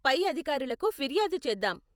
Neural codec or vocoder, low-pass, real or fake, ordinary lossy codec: vocoder, 48 kHz, 128 mel bands, Vocos; none; fake; none